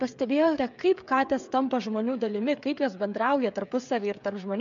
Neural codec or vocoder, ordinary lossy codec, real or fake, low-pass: codec, 16 kHz, 16 kbps, FreqCodec, smaller model; MP3, 64 kbps; fake; 7.2 kHz